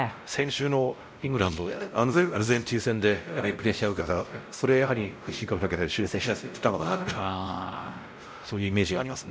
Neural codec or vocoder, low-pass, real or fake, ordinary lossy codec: codec, 16 kHz, 0.5 kbps, X-Codec, WavLM features, trained on Multilingual LibriSpeech; none; fake; none